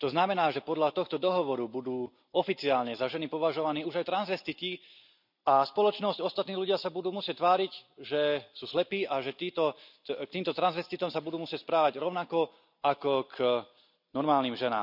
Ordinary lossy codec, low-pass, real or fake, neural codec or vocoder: none; 5.4 kHz; real; none